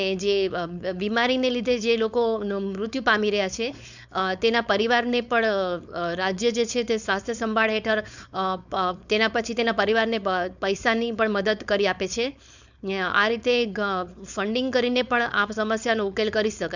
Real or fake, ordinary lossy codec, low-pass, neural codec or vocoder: fake; none; 7.2 kHz; codec, 16 kHz, 4.8 kbps, FACodec